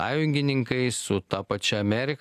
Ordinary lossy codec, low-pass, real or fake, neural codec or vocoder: AAC, 96 kbps; 14.4 kHz; real; none